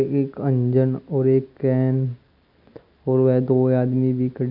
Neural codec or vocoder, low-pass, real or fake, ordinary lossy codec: none; 5.4 kHz; real; none